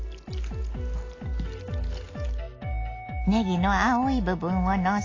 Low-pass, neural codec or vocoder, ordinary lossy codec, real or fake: 7.2 kHz; none; AAC, 32 kbps; real